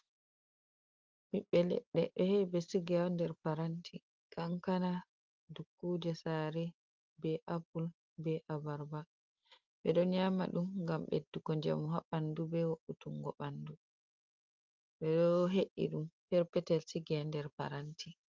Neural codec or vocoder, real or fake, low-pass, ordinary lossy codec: none; real; 7.2 kHz; Opus, 32 kbps